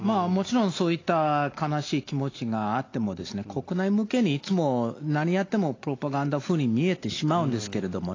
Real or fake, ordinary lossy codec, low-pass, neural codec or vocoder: real; AAC, 32 kbps; 7.2 kHz; none